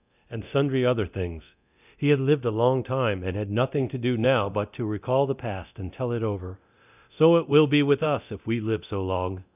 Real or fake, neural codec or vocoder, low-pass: fake; codec, 24 kHz, 0.9 kbps, DualCodec; 3.6 kHz